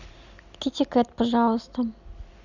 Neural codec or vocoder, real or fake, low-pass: none; real; 7.2 kHz